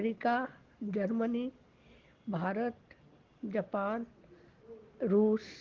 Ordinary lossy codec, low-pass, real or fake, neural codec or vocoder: Opus, 16 kbps; 7.2 kHz; real; none